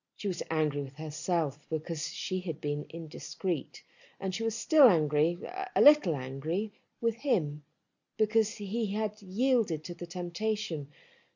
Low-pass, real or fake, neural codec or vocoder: 7.2 kHz; real; none